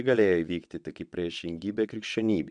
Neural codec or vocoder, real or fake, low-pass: vocoder, 22.05 kHz, 80 mel bands, WaveNeXt; fake; 9.9 kHz